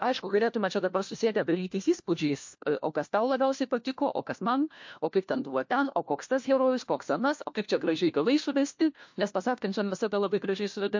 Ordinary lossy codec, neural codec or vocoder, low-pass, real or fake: MP3, 48 kbps; codec, 16 kHz, 1 kbps, FunCodec, trained on LibriTTS, 50 frames a second; 7.2 kHz; fake